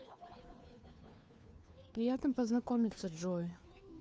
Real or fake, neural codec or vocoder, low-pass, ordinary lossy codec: fake; codec, 16 kHz, 2 kbps, FunCodec, trained on Chinese and English, 25 frames a second; none; none